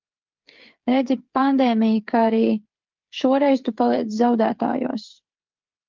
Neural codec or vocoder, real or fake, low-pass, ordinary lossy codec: codec, 16 kHz, 8 kbps, FreqCodec, smaller model; fake; 7.2 kHz; Opus, 32 kbps